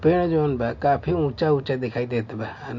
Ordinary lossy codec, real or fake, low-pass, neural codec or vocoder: MP3, 48 kbps; real; 7.2 kHz; none